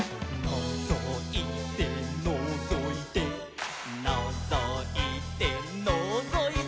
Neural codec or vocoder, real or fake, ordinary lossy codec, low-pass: none; real; none; none